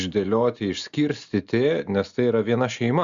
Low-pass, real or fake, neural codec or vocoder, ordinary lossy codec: 7.2 kHz; real; none; Opus, 64 kbps